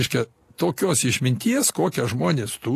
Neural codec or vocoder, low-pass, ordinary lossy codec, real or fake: none; 14.4 kHz; AAC, 48 kbps; real